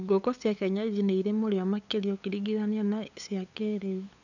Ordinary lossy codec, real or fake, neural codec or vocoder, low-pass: none; fake; codec, 16 kHz, 8 kbps, FunCodec, trained on LibriTTS, 25 frames a second; 7.2 kHz